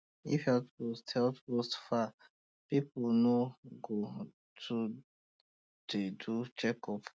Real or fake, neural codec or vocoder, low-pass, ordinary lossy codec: real; none; none; none